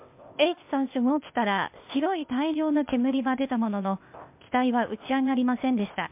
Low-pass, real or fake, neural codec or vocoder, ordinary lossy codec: 3.6 kHz; fake; codec, 16 kHz, 0.8 kbps, ZipCodec; MP3, 32 kbps